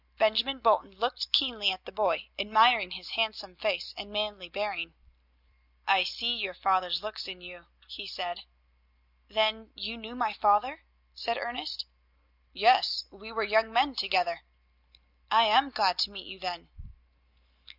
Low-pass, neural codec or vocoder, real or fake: 5.4 kHz; none; real